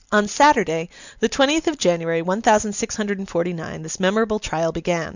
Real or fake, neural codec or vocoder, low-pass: real; none; 7.2 kHz